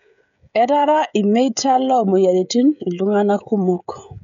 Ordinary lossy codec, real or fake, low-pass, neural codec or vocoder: none; fake; 7.2 kHz; codec, 16 kHz, 16 kbps, FreqCodec, smaller model